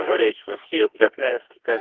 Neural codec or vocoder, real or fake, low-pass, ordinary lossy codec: codec, 24 kHz, 0.9 kbps, WavTokenizer, medium music audio release; fake; 7.2 kHz; Opus, 16 kbps